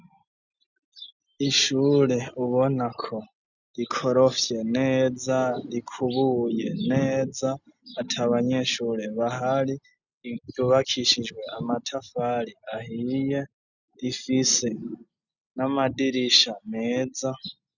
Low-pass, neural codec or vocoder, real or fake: 7.2 kHz; none; real